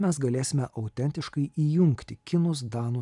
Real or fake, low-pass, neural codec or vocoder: real; 10.8 kHz; none